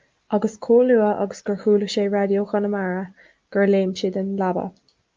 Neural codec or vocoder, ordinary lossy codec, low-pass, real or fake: none; Opus, 24 kbps; 7.2 kHz; real